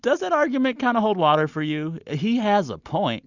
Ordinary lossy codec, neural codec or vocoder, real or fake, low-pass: Opus, 64 kbps; none; real; 7.2 kHz